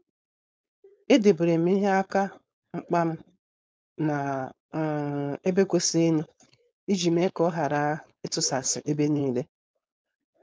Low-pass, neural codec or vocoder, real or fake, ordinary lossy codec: none; codec, 16 kHz, 4.8 kbps, FACodec; fake; none